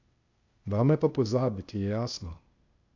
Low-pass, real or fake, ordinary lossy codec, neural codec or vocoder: 7.2 kHz; fake; none; codec, 16 kHz, 0.8 kbps, ZipCodec